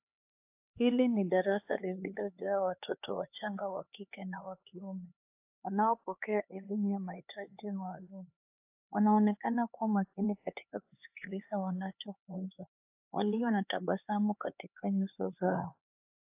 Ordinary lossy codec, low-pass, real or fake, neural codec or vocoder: AAC, 32 kbps; 3.6 kHz; fake; codec, 16 kHz, 4 kbps, X-Codec, HuBERT features, trained on LibriSpeech